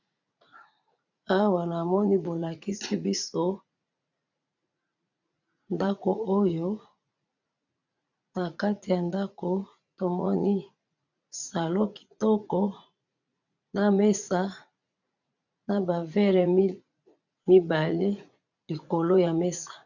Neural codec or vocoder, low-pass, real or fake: vocoder, 44.1 kHz, 80 mel bands, Vocos; 7.2 kHz; fake